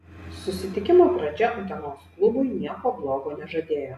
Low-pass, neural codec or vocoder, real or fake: 14.4 kHz; none; real